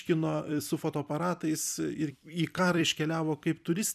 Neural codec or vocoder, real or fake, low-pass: vocoder, 44.1 kHz, 128 mel bands every 256 samples, BigVGAN v2; fake; 14.4 kHz